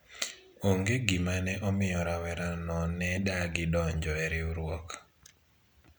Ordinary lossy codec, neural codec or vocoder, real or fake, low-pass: none; none; real; none